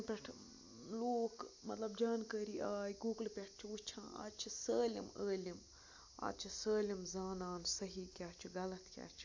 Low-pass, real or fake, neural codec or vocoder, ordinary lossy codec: 7.2 kHz; real; none; AAC, 48 kbps